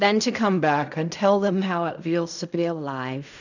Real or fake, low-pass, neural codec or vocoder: fake; 7.2 kHz; codec, 16 kHz in and 24 kHz out, 0.4 kbps, LongCat-Audio-Codec, fine tuned four codebook decoder